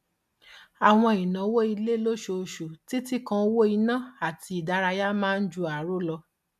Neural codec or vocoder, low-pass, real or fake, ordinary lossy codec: none; 14.4 kHz; real; none